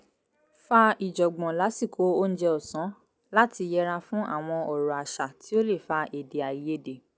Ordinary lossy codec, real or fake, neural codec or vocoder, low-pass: none; real; none; none